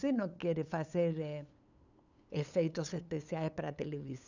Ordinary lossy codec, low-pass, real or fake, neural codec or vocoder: none; 7.2 kHz; fake; codec, 16 kHz, 8 kbps, FunCodec, trained on LibriTTS, 25 frames a second